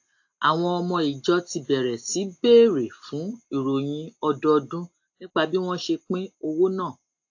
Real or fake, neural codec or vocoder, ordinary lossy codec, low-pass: real; none; AAC, 48 kbps; 7.2 kHz